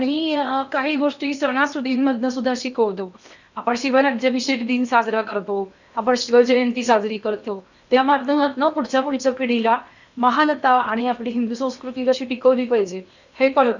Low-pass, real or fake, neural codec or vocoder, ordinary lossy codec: 7.2 kHz; fake; codec, 16 kHz in and 24 kHz out, 0.8 kbps, FocalCodec, streaming, 65536 codes; none